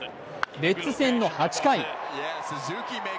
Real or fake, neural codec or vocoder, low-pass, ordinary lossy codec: real; none; none; none